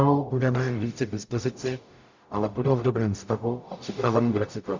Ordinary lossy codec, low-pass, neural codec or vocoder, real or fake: MP3, 64 kbps; 7.2 kHz; codec, 44.1 kHz, 0.9 kbps, DAC; fake